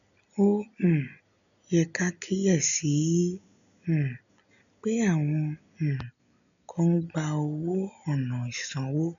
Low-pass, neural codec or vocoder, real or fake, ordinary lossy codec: 7.2 kHz; none; real; none